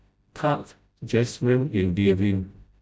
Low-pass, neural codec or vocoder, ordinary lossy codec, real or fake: none; codec, 16 kHz, 0.5 kbps, FreqCodec, smaller model; none; fake